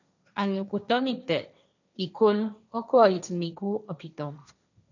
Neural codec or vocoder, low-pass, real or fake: codec, 16 kHz, 1.1 kbps, Voila-Tokenizer; 7.2 kHz; fake